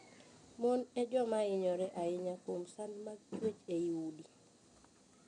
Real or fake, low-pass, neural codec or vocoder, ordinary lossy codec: real; 9.9 kHz; none; none